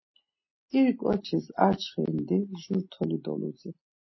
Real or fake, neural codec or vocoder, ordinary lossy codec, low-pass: real; none; MP3, 24 kbps; 7.2 kHz